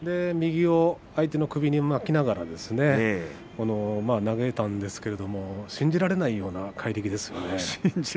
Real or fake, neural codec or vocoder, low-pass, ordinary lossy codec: real; none; none; none